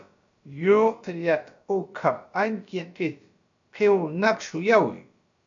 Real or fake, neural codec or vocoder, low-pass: fake; codec, 16 kHz, about 1 kbps, DyCAST, with the encoder's durations; 7.2 kHz